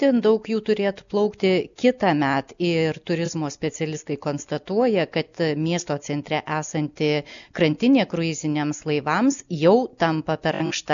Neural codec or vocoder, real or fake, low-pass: none; real; 7.2 kHz